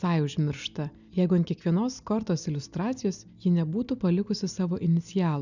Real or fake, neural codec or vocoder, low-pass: real; none; 7.2 kHz